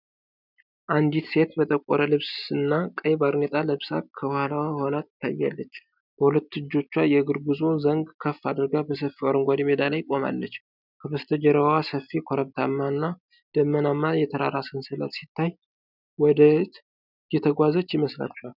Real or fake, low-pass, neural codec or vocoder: real; 5.4 kHz; none